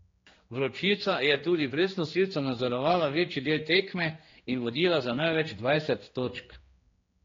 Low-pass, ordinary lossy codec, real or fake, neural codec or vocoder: 7.2 kHz; AAC, 32 kbps; fake; codec, 16 kHz, 2 kbps, X-Codec, HuBERT features, trained on general audio